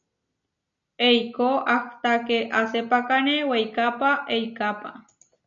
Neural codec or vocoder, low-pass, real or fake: none; 7.2 kHz; real